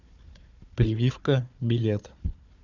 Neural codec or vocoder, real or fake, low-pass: codec, 16 kHz, 4 kbps, FunCodec, trained on Chinese and English, 50 frames a second; fake; 7.2 kHz